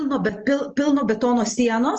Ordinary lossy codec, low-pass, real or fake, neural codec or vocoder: Opus, 24 kbps; 7.2 kHz; real; none